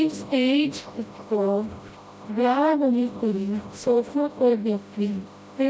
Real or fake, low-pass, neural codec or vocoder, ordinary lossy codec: fake; none; codec, 16 kHz, 0.5 kbps, FreqCodec, smaller model; none